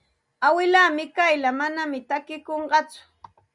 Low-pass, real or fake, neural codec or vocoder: 10.8 kHz; real; none